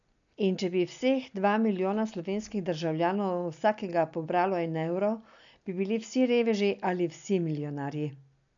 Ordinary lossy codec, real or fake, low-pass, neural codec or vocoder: none; real; 7.2 kHz; none